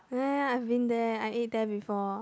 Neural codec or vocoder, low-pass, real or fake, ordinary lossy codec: none; none; real; none